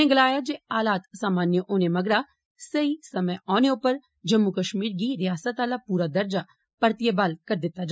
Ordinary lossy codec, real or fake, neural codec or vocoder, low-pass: none; real; none; none